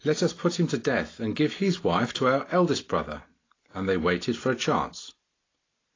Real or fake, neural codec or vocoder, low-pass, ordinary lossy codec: real; none; 7.2 kHz; AAC, 32 kbps